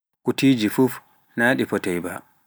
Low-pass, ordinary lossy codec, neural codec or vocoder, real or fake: none; none; none; real